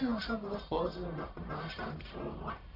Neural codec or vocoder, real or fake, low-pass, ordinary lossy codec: codec, 44.1 kHz, 1.7 kbps, Pupu-Codec; fake; 5.4 kHz; none